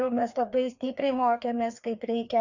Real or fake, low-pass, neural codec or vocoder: fake; 7.2 kHz; codec, 16 kHz, 2 kbps, FreqCodec, larger model